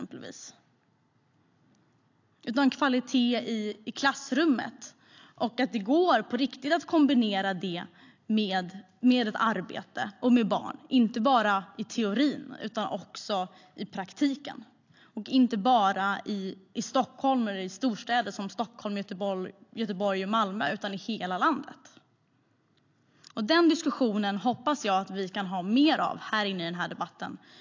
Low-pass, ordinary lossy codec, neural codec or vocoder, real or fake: 7.2 kHz; AAC, 48 kbps; none; real